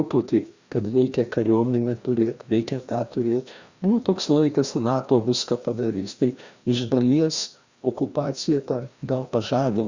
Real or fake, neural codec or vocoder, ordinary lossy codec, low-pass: fake; codec, 16 kHz, 1 kbps, FreqCodec, larger model; Opus, 64 kbps; 7.2 kHz